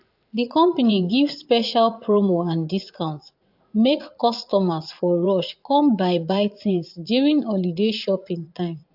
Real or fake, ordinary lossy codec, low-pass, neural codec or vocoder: fake; AAC, 48 kbps; 5.4 kHz; vocoder, 44.1 kHz, 80 mel bands, Vocos